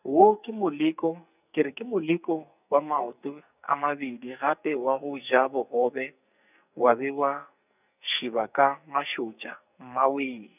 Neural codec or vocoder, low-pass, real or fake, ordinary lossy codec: codec, 44.1 kHz, 2.6 kbps, SNAC; 3.6 kHz; fake; none